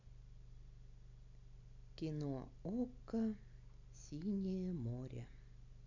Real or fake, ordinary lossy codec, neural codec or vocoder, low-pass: real; none; none; 7.2 kHz